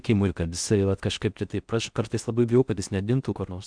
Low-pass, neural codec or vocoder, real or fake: 9.9 kHz; codec, 16 kHz in and 24 kHz out, 0.6 kbps, FocalCodec, streaming, 4096 codes; fake